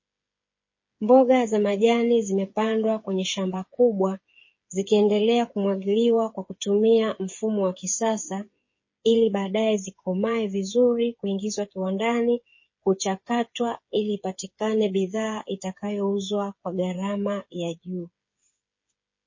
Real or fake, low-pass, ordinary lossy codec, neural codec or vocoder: fake; 7.2 kHz; MP3, 32 kbps; codec, 16 kHz, 8 kbps, FreqCodec, smaller model